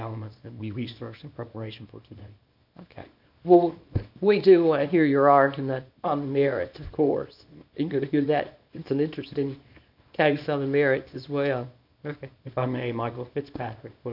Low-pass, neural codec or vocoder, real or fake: 5.4 kHz; codec, 24 kHz, 0.9 kbps, WavTokenizer, small release; fake